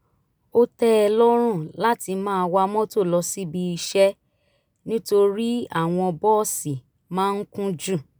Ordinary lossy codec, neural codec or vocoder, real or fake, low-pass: none; none; real; none